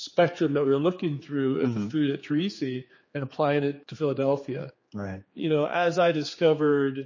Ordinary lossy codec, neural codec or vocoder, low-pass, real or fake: MP3, 32 kbps; codec, 16 kHz, 4 kbps, X-Codec, HuBERT features, trained on general audio; 7.2 kHz; fake